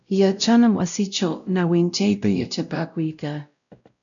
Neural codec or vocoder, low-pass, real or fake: codec, 16 kHz, 0.5 kbps, X-Codec, WavLM features, trained on Multilingual LibriSpeech; 7.2 kHz; fake